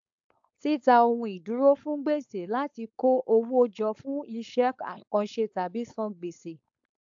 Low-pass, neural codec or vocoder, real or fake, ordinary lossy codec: 7.2 kHz; codec, 16 kHz, 4.8 kbps, FACodec; fake; AAC, 64 kbps